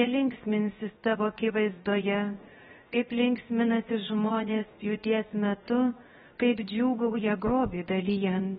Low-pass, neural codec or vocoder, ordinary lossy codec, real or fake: 7.2 kHz; codec, 16 kHz, 0.7 kbps, FocalCodec; AAC, 16 kbps; fake